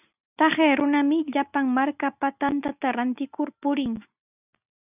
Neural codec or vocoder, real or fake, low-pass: none; real; 3.6 kHz